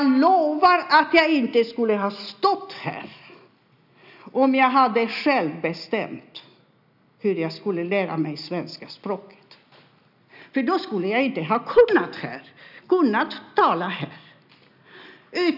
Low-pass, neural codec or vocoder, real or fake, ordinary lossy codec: 5.4 kHz; none; real; none